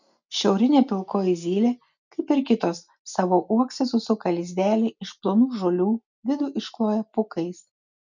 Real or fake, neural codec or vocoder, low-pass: real; none; 7.2 kHz